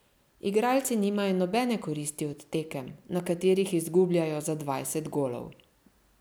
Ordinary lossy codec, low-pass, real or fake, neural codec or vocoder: none; none; real; none